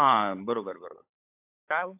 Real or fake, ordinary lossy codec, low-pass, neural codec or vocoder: fake; AAC, 32 kbps; 3.6 kHz; codec, 16 kHz, 16 kbps, FunCodec, trained on LibriTTS, 50 frames a second